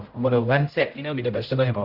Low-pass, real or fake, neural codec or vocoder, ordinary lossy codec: 5.4 kHz; fake; codec, 16 kHz, 0.5 kbps, X-Codec, HuBERT features, trained on general audio; Opus, 24 kbps